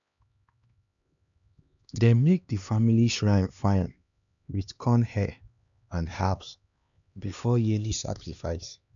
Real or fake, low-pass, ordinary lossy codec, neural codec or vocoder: fake; 7.2 kHz; none; codec, 16 kHz, 2 kbps, X-Codec, HuBERT features, trained on LibriSpeech